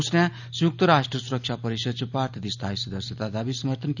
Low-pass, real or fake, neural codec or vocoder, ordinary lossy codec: 7.2 kHz; real; none; none